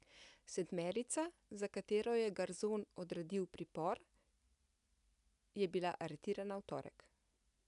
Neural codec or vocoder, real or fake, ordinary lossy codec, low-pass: none; real; none; 10.8 kHz